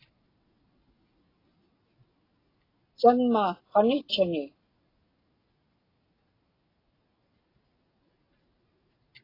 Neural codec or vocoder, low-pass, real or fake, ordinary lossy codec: none; 5.4 kHz; real; AAC, 24 kbps